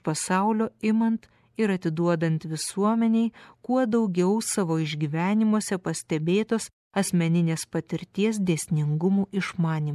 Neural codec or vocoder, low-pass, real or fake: none; 14.4 kHz; real